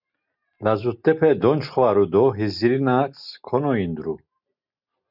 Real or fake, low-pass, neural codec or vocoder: real; 5.4 kHz; none